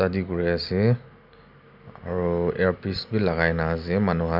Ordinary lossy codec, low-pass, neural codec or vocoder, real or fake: none; 5.4 kHz; none; real